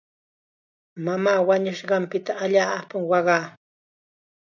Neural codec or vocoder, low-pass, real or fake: none; 7.2 kHz; real